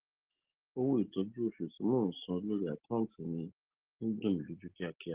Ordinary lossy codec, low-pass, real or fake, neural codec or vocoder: Opus, 16 kbps; 3.6 kHz; real; none